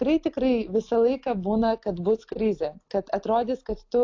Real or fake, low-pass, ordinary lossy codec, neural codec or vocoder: real; 7.2 kHz; AAC, 48 kbps; none